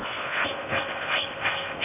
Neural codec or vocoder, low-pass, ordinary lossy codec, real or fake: codec, 16 kHz in and 24 kHz out, 0.6 kbps, FocalCodec, streaming, 2048 codes; 3.6 kHz; none; fake